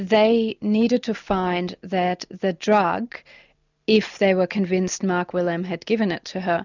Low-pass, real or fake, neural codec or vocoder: 7.2 kHz; real; none